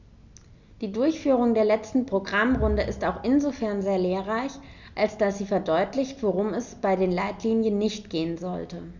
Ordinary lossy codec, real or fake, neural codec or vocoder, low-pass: none; real; none; 7.2 kHz